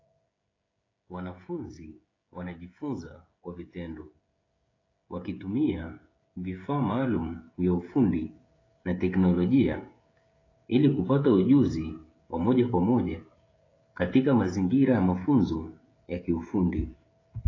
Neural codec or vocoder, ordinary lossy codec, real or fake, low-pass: codec, 16 kHz, 16 kbps, FreqCodec, smaller model; AAC, 32 kbps; fake; 7.2 kHz